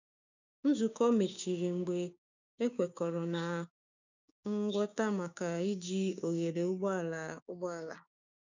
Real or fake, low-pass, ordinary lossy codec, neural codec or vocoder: fake; 7.2 kHz; none; codec, 16 kHz, 6 kbps, DAC